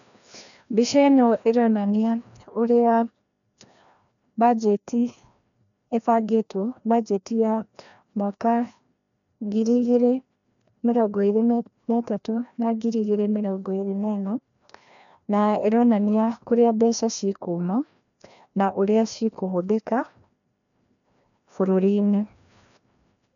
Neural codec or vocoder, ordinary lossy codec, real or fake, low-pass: codec, 16 kHz, 1 kbps, FreqCodec, larger model; none; fake; 7.2 kHz